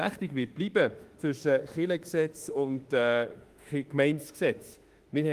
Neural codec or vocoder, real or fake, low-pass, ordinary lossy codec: autoencoder, 48 kHz, 32 numbers a frame, DAC-VAE, trained on Japanese speech; fake; 14.4 kHz; Opus, 24 kbps